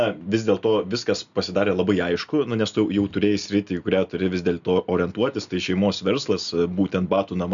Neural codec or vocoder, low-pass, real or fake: none; 7.2 kHz; real